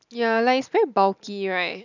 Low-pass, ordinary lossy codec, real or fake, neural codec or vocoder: 7.2 kHz; none; real; none